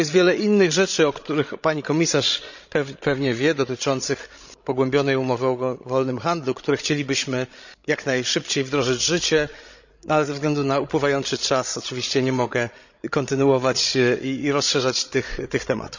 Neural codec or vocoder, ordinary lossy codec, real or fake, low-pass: codec, 16 kHz, 16 kbps, FreqCodec, larger model; none; fake; 7.2 kHz